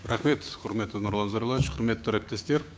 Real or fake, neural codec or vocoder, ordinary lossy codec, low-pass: fake; codec, 16 kHz, 6 kbps, DAC; none; none